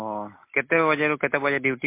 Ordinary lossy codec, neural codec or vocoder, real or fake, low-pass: MP3, 24 kbps; none; real; 3.6 kHz